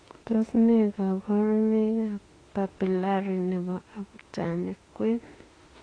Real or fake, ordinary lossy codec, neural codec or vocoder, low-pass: fake; AAC, 32 kbps; autoencoder, 48 kHz, 32 numbers a frame, DAC-VAE, trained on Japanese speech; 9.9 kHz